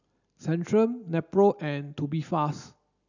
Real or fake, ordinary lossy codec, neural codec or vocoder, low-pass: real; none; none; 7.2 kHz